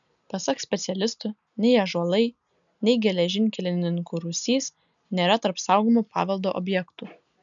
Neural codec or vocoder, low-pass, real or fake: none; 7.2 kHz; real